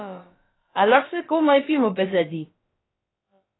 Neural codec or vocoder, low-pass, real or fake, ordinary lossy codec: codec, 16 kHz, about 1 kbps, DyCAST, with the encoder's durations; 7.2 kHz; fake; AAC, 16 kbps